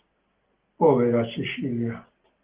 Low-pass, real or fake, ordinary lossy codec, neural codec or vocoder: 3.6 kHz; real; Opus, 16 kbps; none